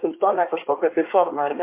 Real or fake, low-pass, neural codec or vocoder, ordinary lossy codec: fake; 3.6 kHz; codec, 24 kHz, 1 kbps, SNAC; MP3, 24 kbps